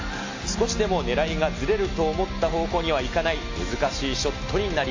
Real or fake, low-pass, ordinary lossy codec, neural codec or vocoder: real; 7.2 kHz; AAC, 48 kbps; none